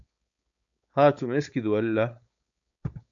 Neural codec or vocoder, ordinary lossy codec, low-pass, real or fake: codec, 16 kHz, 4.8 kbps, FACodec; AAC, 64 kbps; 7.2 kHz; fake